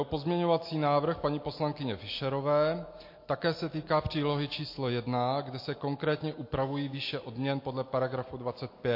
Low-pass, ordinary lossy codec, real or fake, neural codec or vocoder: 5.4 kHz; MP3, 32 kbps; real; none